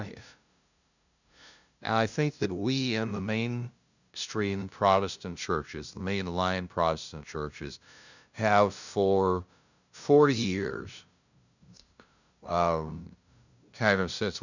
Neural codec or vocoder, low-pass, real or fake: codec, 16 kHz, 0.5 kbps, FunCodec, trained on LibriTTS, 25 frames a second; 7.2 kHz; fake